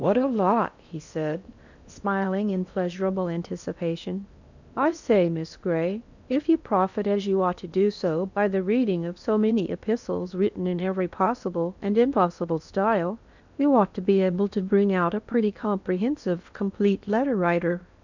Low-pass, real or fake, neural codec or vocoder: 7.2 kHz; fake; codec, 16 kHz in and 24 kHz out, 0.8 kbps, FocalCodec, streaming, 65536 codes